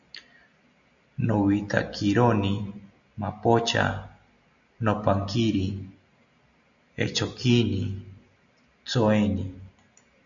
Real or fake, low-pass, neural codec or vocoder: real; 7.2 kHz; none